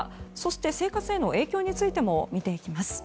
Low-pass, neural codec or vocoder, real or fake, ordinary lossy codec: none; none; real; none